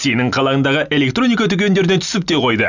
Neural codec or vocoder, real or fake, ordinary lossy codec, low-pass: none; real; none; 7.2 kHz